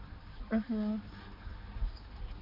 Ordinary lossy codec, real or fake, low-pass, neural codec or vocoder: MP3, 32 kbps; fake; 5.4 kHz; codec, 16 kHz, 4 kbps, X-Codec, HuBERT features, trained on balanced general audio